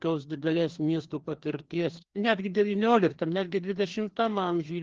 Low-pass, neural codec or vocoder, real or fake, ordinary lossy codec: 7.2 kHz; codec, 16 kHz, 2 kbps, FreqCodec, larger model; fake; Opus, 16 kbps